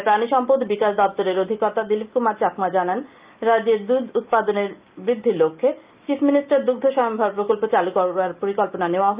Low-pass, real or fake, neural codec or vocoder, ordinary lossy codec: 3.6 kHz; real; none; Opus, 24 kbps